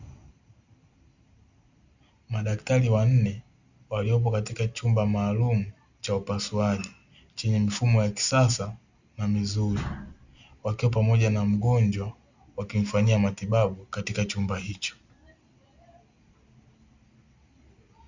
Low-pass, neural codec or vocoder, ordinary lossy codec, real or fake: 7.2 kHz; none; Opus, 64 kbps; real